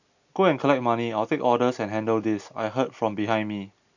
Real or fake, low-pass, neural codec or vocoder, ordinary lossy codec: real; 7.2 kHz; none; none